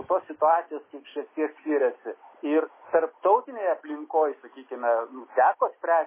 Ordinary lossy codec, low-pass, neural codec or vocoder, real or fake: MP3, 16 kbps; 3.6 kHz; none; real